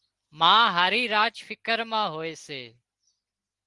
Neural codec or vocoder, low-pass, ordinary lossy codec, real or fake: none; 10.8 kHz; Opus, 24 kbps; real